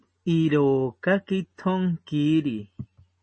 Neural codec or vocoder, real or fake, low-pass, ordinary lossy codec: none; real; 9.9 kHz; MP3, 32 kbps